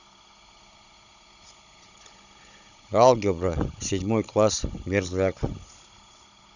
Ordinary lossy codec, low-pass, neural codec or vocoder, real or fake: none; 7.2 kHz; codec, 16 kHz, 16 kbps, FunCodec, trained on Chinese and English, 50 frames a second; fake